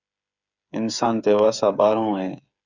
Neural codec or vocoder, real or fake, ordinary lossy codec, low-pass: codec, 16 kHz, 8 kbps, FreqCodec, smaller model; fake; Opus, 64 kbps; 7.2 kHz